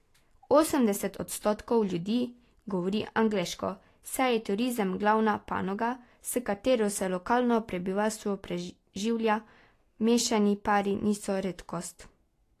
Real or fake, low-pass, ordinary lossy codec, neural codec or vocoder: real; 14.4 kHz; AAC, 48 kbps; none